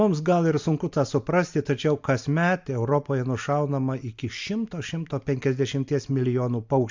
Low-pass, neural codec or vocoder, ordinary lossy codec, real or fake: 7.2 kHz; none; MP3, 64 kbps; real